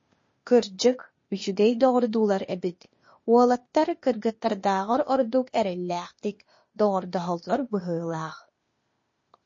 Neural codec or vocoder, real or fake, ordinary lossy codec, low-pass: codec, 16 kHz, 0.8 kbps, ZipCodec; fake; MP3, 32 kbps; 7.2 kHz